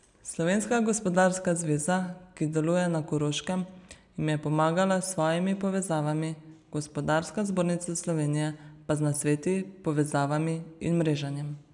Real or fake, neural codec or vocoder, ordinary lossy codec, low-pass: real; none; none; 10.8 kHz